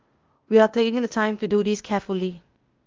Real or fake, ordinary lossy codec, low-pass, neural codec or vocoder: fake; Opus, 32 kbps; 7.2 kHz; codec, 16 kHz, 0.8 kbps, ZipCodec